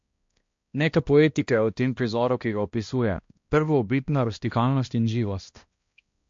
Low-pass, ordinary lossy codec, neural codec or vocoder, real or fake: 7.2 kHz; MP3, 48 kbps; codec, 16 kHz, 1 kbps, X-Codec, HuBERT features, trained on balanced general audio; fake